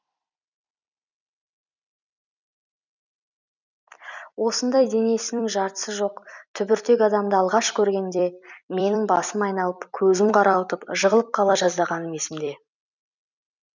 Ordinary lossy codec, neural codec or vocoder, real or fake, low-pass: none; vocoder, 44.1 kHz, 128 mel bands every 256 samples, BigVGAN v2; fake; 7.2 kHz